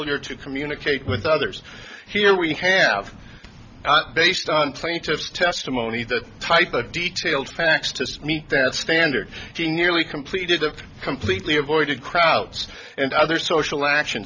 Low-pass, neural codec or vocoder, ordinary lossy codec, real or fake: 7.2 kHz; none; MP3, 64 kbps; real